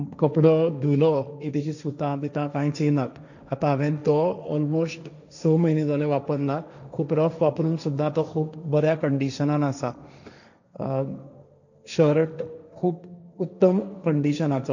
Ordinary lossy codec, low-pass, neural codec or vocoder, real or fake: none; 7.2 kHz; codec, 16 kHz, 1.1 kbps, Voila-Tokenizer; fake